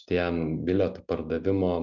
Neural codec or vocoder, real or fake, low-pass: none; real; 7.2 kHz